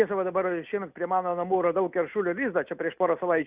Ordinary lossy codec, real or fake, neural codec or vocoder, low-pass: Opus, 64 kbps; real; none; 3.6 kHz